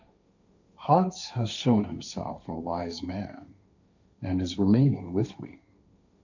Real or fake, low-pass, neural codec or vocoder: fake; 7.2 kHz; codec, 16 kHz, 1.1 kbps, Voila-Tokenizer